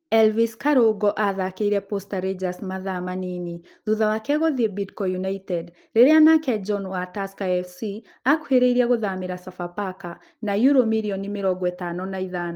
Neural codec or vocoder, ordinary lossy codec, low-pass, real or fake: none; Opus, 24 kbps; 19.8 kHz; real